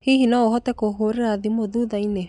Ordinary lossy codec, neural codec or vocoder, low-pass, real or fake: none; none; 10.8 kHz; real